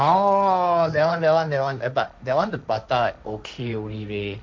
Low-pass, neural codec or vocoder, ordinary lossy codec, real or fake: none; codec, 16 kHz, 1.1 kbps, Voila-Tokenizer; none; fake